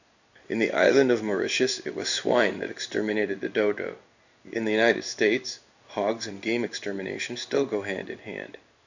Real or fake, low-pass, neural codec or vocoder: fake; 7.2 kHz; codec, 16 kHz in and 24 kHz out, 1 kbps, XY-Tokenizer